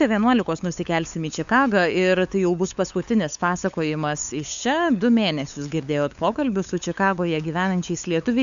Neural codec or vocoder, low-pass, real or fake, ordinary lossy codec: codec, 16 kHz, 8 kbps, FunCodec, trained on LibriTTS, 25 frames a second; 7.2 kHz; fake; MP3, 96 kbps